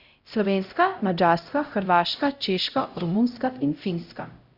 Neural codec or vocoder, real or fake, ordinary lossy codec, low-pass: codec, 16 kHz, 0.5 kbps, X-Codec, HuBERT features, trained on LibriSpeech; fake; Opus, 64 kbps; 5.4 kHz